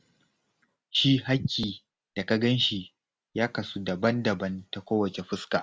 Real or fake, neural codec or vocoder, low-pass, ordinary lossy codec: real; none; none; none